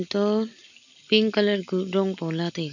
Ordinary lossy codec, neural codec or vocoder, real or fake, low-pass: none; none; real; 7.2 kHz